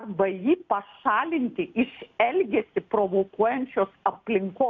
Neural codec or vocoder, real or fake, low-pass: none; real; 7.2 kHz